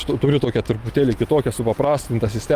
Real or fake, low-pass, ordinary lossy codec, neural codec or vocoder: real; 14.4 kHz; Opus, 32 kbps; none